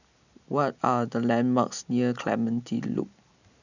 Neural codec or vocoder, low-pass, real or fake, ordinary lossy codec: none; 7.2 kHz; real; none